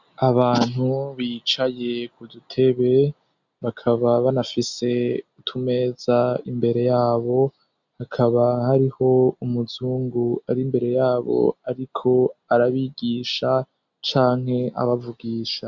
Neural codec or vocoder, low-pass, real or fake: none; 7.2 kHz; real